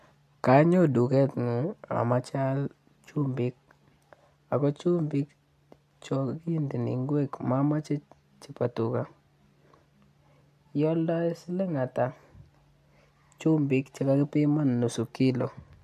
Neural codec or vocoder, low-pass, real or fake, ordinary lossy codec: none; 14.4 kHz; real; MP3, 64 kbps